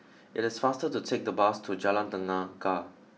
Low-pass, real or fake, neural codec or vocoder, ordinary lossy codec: none; real; none; none